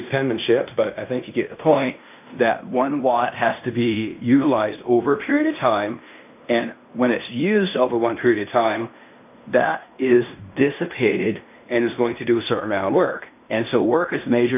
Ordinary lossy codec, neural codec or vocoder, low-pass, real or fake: AAC, 32 kbps; codec, 16 kHz in and 24 kHz out, 0.9 kbps, LongCat-Audio-Codec, fine tuned four codebook decoder; 3.6 kHz; fake